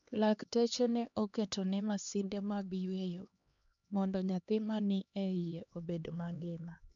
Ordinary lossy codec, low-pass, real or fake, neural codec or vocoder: none; 7.2 kHz; fake; codec, 16 kHz, 2 kbps, X-Codec, HuBERT features, trained on LibriSpeech